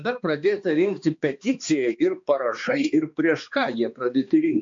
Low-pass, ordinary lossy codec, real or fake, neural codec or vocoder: 7.2 kHz; MP3, 48 kbps; fake; codec, 16 kHz, 2 kbps, X-Codec, HuBERT features, trained on balanced general audio